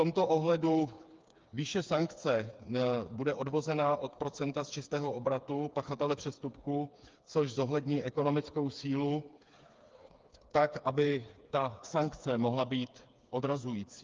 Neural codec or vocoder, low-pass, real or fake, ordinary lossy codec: codec, 16 kHz, 4 kbps, FreqCodec, smaller model; 7.2 kHz; fake; Opus, 24 kbps